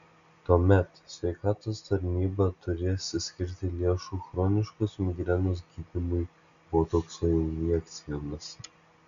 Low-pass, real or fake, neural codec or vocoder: 7.2 kHz; real; none